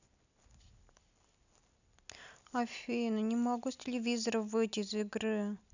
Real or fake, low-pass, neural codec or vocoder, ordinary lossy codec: real; 7.2 kHz; none; none